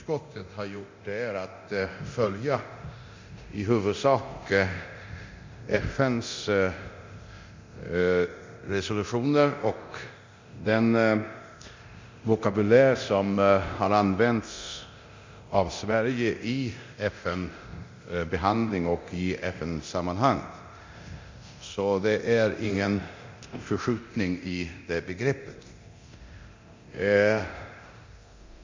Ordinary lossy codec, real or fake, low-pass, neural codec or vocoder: MP3, 64 kbps; fake; 7.2 kHz; codec, 24 kHz, 0.9 kbps, DualCodec